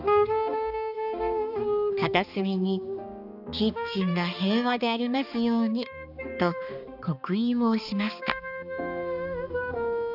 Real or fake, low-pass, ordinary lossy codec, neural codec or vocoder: fake; 5.4 kHz; none; codec, 16 kHz, 2 kbps, X-Codec, HuBERT features, trained on balanced general audio